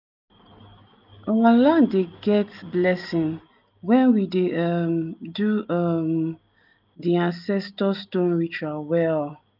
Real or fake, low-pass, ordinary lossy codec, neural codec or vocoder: real; 5.4 kHz; none; none